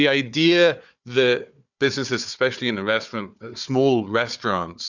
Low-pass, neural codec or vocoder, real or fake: 7.2 kHz; codec, 16 kHz, 4 kbps, FunCodec, trained on Chinese and English, 50 frames a second; fake